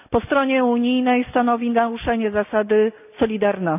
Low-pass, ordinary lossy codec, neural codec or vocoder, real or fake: 3.6 kHz; none; none; real